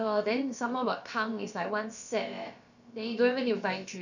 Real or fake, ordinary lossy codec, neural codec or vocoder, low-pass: fake; none; codec, 16 kHz, about 1 kbps, DyCAST, with the encoder's durations; 7.2 kHz